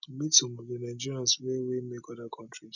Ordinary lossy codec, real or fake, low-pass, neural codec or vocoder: none; real; 7.2 kHz; none